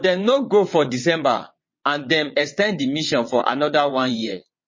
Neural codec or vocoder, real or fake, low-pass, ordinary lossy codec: vocoder, 22.05 kHz, 80 mel bands, WaveNeXt; fake; 7.2 kHz; MP3, 32 kbps